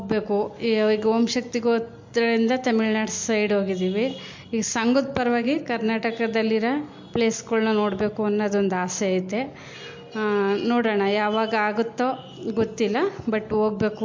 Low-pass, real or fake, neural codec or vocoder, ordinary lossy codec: 7.2 kHz; real; none; MP3, 48 kbps